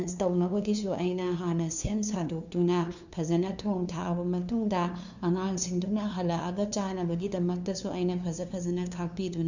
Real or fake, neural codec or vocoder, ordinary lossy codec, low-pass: fake; codec, 16 kHz, 2 kbps, FunCodec, trained on LibriTTS, 25 frames a second; none; 7.2 kHz